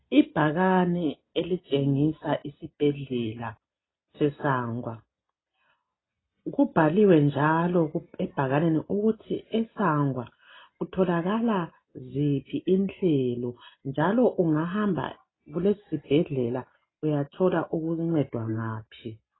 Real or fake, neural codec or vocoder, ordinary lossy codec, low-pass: real; none; AAC, 16 kbps; 7.2 kHz